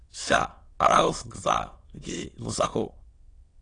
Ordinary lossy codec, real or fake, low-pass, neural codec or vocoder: AAC, 32 kbps; fake; 9.9 kHz; autoencoder, 22.05 kHz, a latent of 192 numbers a frame, VITS, trained on many speakers